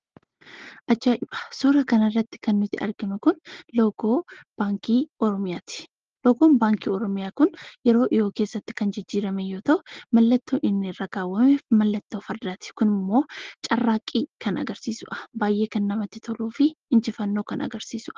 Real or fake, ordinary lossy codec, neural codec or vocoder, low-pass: real; Opus, 16 kbps; none; 7.2 kHz